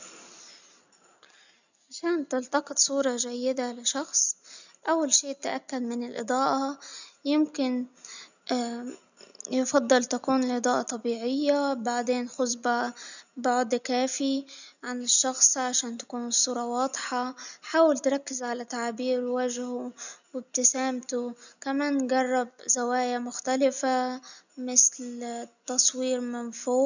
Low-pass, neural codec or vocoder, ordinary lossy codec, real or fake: 7.2 kHz; none; none; real